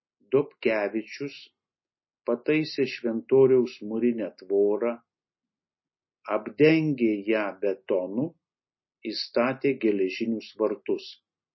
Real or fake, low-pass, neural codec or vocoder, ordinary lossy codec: real; 7.2 kHz; none; MP3, 24 kbps